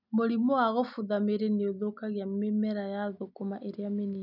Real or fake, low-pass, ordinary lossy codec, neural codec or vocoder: real; 5.4 kHz; none; none